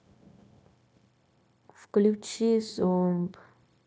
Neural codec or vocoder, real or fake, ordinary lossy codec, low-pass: codec, 16 kHz, 0.9 kbps, LongCat-Audio-Codec; fake; none; none